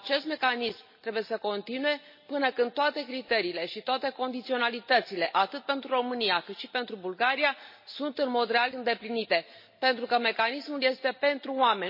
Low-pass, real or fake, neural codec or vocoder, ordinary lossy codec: 5.4 kHz; real; none; MP3, 24 kbps